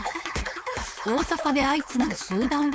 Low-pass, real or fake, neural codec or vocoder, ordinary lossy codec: none; fake; codec, 16 kHz, 4.8 kbps, FACodec; none